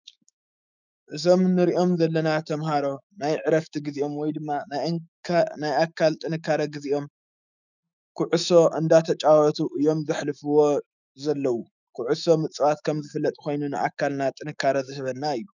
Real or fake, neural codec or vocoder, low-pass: fake; autoencoder, 48 kHz, 128 numbers a frame, DAC-VAE, trained on Japanese speech; 7.2 kHz